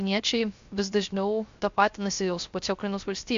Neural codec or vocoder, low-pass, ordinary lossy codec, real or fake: codec, 16 kHz, 0.3 kbps, FocalCodec; 7.2 kHz; AAC, 64 kbps; fake